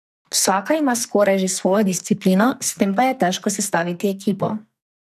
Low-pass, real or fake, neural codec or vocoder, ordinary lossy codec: 14.4 kHz; fake; codec, 44.1 kHz, 2.6 kbps, SNAC; none